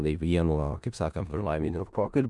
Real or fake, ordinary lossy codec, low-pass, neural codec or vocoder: fake; MP3, 96 kbps; 10.8 kHz; codec, 16 kHz in and 24 kHz out, 0.4 kbps, LongCat-Audio-Codec, four codebook decoder